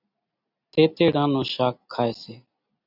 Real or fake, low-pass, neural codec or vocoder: real; 5.4 kHz; none